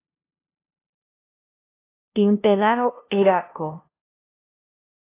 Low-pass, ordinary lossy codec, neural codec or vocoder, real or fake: 3.6 kHz; AAC, 24 kbps; codec, 16 kHz, 0.5 kbps, FunCodec, trained on LibriTTS, 25 frames a second; fake